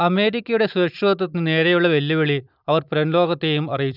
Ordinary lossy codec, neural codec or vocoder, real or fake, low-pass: none; none; real; 5.4 kHz